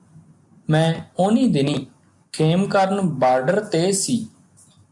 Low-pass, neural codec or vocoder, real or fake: 10.8 kHz; none; real